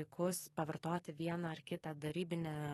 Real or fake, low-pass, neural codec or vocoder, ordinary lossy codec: fake; 19.8 kHz; codec, 44.1 kHz, 7.8 kbps, DAC; AAC, 32 kbps